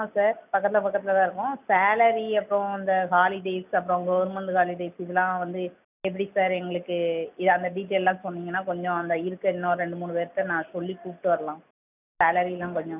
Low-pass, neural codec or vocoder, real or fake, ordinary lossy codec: 3.6 kHz; none; real; none